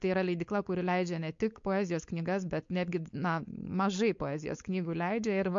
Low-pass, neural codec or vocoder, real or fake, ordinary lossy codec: 7.2 kHz; codec, 16 kHz, 4.8 kbps, FACodec; fake; MP3, 48 kbps